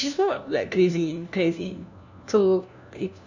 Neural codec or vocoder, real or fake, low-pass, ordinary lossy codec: codec, 16 kHz, 1 kbps, FunCodec, trained on LibriTTS, 50 frames a second; fake; 7.2 kHz; none